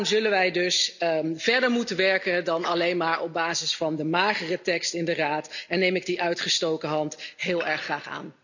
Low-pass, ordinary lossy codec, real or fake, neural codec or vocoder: 7.2 kHz; none; real; none